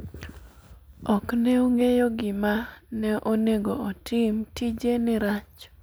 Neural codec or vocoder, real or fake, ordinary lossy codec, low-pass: none; real; none; none